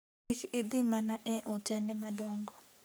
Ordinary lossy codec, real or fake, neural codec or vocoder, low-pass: none; fake; codec, 44.1 kHz, 3.4 kbps, Pupu-Codec; none